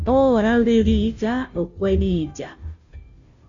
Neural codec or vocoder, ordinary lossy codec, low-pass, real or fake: codec, 16 kHz, 0.5 kbps, FunCodec, trained on Chinese and English, 25 frames a second; Opus, 64 kbps; 7.2 kHz; fake